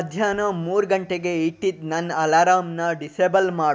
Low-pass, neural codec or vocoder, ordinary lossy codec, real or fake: none; none; none; real